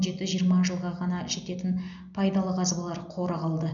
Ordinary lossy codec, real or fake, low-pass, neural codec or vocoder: none; real; 7.2 kHz; none